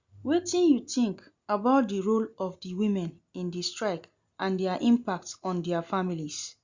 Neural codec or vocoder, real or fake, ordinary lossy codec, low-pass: none; real; Opus, 64 kbps; 7.2 kHz